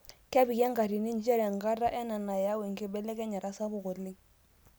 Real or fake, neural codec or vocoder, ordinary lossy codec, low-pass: real; none; none; none